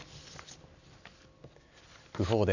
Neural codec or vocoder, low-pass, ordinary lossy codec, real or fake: none; 7.2 kHz; none; real